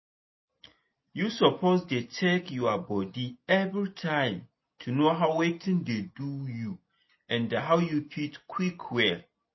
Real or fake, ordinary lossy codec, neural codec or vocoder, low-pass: real; MP3, 24 kbps; none; 7.2 kHz